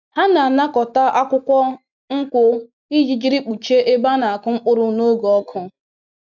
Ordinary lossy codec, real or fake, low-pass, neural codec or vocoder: none; real; 7.2 kHz; none